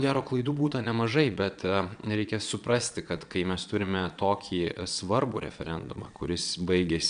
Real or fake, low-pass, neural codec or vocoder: fake; 9.9 kHz; vocoder, 22.05 kHz, 80 mel bands, Vocos